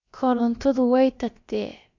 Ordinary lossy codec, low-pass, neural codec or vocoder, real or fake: Opus, 64 kbps; 7.2 kHz; codec, 16 kHz, about 1 kbps, DyCAST, with the encoder's durations; fake